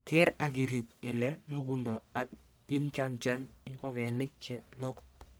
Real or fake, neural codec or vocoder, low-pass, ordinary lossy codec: fake; codec, 44.1 kHz, 1.7 kbps, Pupu-Codec; none; none